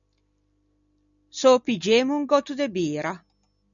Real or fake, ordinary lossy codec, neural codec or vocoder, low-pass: real; MP3, 96 kbps; none; 7.2 kHz